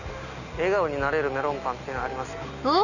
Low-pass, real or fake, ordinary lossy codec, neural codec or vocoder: 7.2 kHz; real; none; none